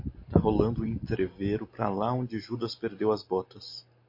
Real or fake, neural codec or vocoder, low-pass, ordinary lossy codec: real; none; 5.4 kHz; MP3, 32 kbps